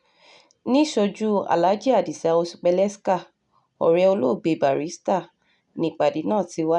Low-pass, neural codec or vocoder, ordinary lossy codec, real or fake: 10.8 kHz; none; none; real